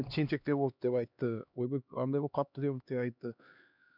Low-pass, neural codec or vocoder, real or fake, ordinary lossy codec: 5.4 kHz; codec, 16 kHz, 2 kbps, X-Codec, HuBERT features, trained on LibriSpeech; fake; none